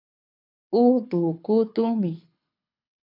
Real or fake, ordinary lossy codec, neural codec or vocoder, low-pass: fake; MP3, 48 kbps; codec, 24 kHz, 6 kbps, HILCodec; 5.4 kHz